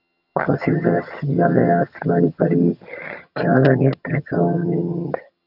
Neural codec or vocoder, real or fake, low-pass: vocoder, 22.05 kHz, 80 mel bands, HiFi-GAN; fake; 5.4 kHz